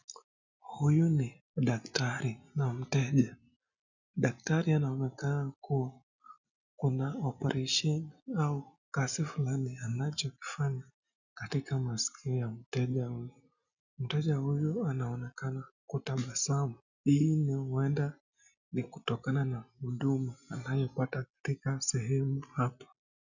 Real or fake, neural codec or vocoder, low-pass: real; none; 7.2 kHz